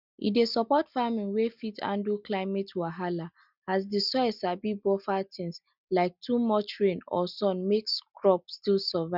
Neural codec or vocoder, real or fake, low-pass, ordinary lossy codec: none; real; 5.4 kHz; none